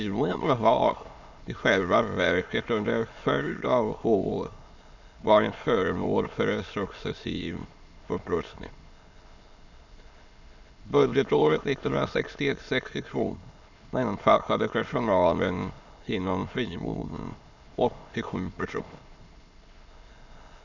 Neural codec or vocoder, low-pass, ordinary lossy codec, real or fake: autoencoder, 22.05 kHz, a latent of 192 numbers a frame, VITS, trained on many speakers; 7.2 kHz; none; fake